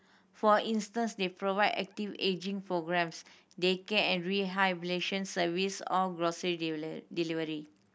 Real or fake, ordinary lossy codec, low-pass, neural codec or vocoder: real; none; none; none